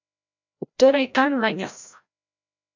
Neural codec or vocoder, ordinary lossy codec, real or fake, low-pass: codec, 16 kHz, 0.5 kbps, FreqCodec, larger model; MP3, 64 kbps; fake; 7.2 kHz